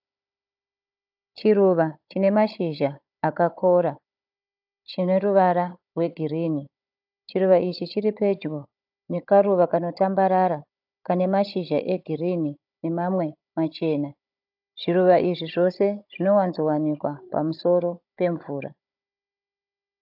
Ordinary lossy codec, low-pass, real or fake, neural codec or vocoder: AAC, 48 kbps; 5.4 kHz; fake; codec, 16 kHz, 16 kbps, FunCodec, trained on Chinese and English, 50 frames a second